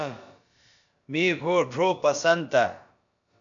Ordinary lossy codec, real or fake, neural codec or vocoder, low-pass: MP3, 64 kbps; fake; codec, 16 kHz, about 1 kbps, DyCAST, with the encoder's durations; 7.2 kHz